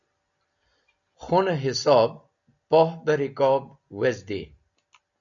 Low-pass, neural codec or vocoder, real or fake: 7.2 kHz; none; real